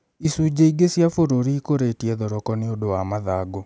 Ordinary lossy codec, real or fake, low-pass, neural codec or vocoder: none; real; none; none